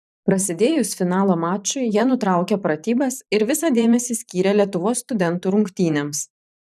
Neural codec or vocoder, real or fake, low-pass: vocoder, 44.1 kHz, 128 mel bands every 512 samples, BigVGAN v2; fake; 14.4 kHz